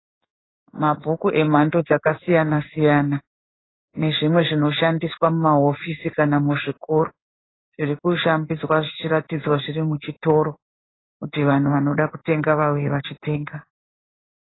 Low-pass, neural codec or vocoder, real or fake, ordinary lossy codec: 7.2 kHz; codec, 16 kHz in and 24 kHz out, 1 kbps, XY-Tokenizer; fake; AAC, 16 kbps